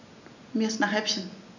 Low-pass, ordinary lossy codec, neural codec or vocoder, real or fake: 7.2 kHz; none; none; real